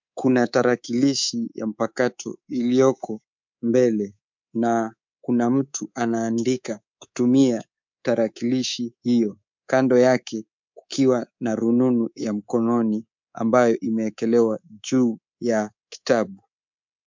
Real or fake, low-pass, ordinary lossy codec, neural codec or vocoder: fake; 7.2 kHz; MP3, 64 kbps; codec, 24 kHz, 3.1 kbps, DualCodec